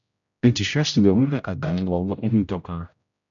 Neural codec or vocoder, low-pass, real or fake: codec, 16 kHz, 0.5 kbps, X-Codec, HuBERT features, trained on general audio; 7.2 kHz; fake